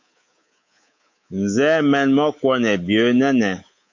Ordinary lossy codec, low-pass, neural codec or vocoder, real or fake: MP3, 48 kbps; 7.2 kHz; codec, 24 kHz, 3.1 kbps, DualCodec; fake